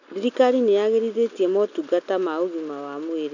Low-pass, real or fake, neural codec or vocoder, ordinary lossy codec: 7.2 kHz; real; none; none